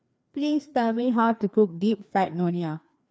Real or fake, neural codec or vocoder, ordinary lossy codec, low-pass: fake; codec, 16 kHz, 2 kbps, FreqCodec, larger model; none; none